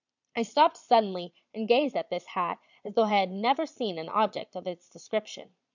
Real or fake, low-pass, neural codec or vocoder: fake; 7.2 kHz; vocoder, 22.05 kHz, 80 mel bands, Vocos